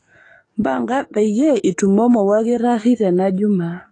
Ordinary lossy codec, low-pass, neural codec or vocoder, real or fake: AAC, 32 kbps; 10.8 kHz; codec, 44.1 kHz, 7.8 kbps, DAC; fake